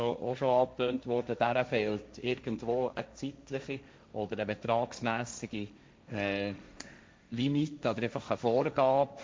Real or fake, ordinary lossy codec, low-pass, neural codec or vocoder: fake; none; none; codec, 16 kHz, 1.1 kbps, Voila-Tokenizer